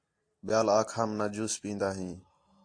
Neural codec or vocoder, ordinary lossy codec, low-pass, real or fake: none; AAC, 48 kbps; 9.9 kHz; real